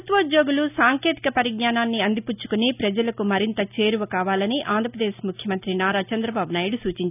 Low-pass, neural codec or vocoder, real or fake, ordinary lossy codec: 3.6 kHz; none; real; none